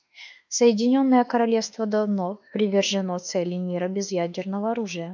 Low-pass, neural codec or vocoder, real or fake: 7.2 kHz; autoencoder, 48 kHz, 32 numbers a frame, DAC-VAE, trained on Japanese speech; fake